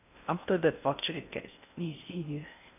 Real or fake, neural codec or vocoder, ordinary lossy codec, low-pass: fake; codec, 16 kHz in and 24 kHz out, 0.6 kbps, FocalCodec, streaming, 4096 codes; none; 3.6 kHz